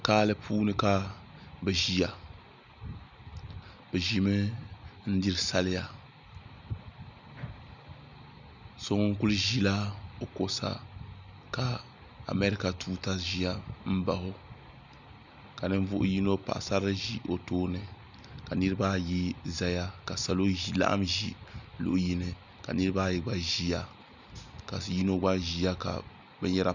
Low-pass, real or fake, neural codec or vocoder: 7.2 kHz; real; none